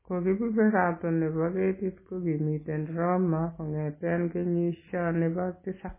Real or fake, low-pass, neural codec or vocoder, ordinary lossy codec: real; 3.6 kHz; none; MP3, 16 kbps